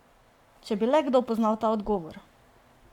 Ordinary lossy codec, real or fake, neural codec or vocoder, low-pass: none; fake; codec, 44.1 kHz, 7.8 kbps, Pupu-Codec; 19.8 kHz